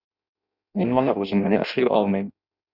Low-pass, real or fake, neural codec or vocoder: 5.4 kHz; fake; codec, 16 kHz in and 24 kHz out, 0.6 kbps, FireRedTTS-2 codec